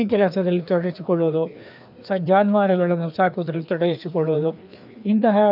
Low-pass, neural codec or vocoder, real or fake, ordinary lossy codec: 5.4 kHz; codec, 16 kHz, 2 kbps, FreqCodec, larger model; fake; none